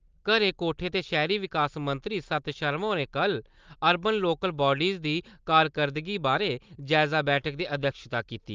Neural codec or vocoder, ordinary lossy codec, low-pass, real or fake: none; Opus, 24 kbps; 7.2 kHz; real